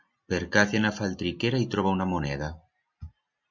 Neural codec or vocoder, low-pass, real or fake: none; 7.2 kHz; real